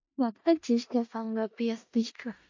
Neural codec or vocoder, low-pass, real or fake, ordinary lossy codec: codec, 16 kHz in and 24 kHz out, 0.4 kbps, LongCat-Audio-Codec, four codebook decoder; 7.2 kHz; fake; MP3, 48 kbps